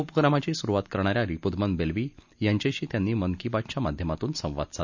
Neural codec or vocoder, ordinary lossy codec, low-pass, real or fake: none; none; 7.2 kHz; real